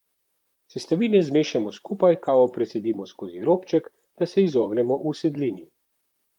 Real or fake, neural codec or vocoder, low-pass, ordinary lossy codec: fake; vocoder, 44.1 kHz, 128 mel bands, Pupu-Vocoder; 19.8 kHz; Opus, 32 kbps